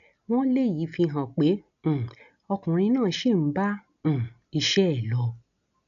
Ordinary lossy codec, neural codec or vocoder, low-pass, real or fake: none; none; 7.2 kHz; real